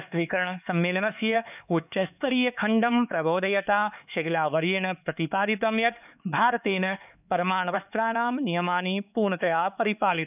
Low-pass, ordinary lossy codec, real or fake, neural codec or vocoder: 3.6 kHz; none; fake; codec, 16 kHz, 4 kbps, X-Codec, HuBERT features, trained on LibriSpeech